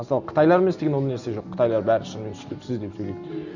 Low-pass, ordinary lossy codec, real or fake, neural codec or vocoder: 7.2 kHz; none; real; none